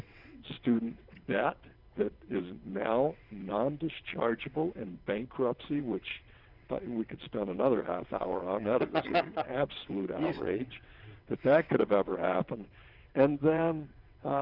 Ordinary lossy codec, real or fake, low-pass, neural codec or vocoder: Opus, 64 kbps; fake; 5.4 kHz; vocoder, 22.05 kHz, 80 mel bands, WaveNeXt